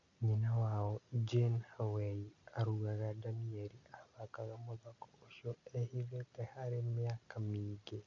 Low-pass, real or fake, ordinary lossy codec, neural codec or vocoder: 7.2 kHz; real; none; none